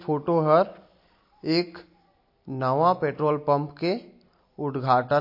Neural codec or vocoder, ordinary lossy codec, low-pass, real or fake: none; MP3, 32 kbps; 5.4 kHz; real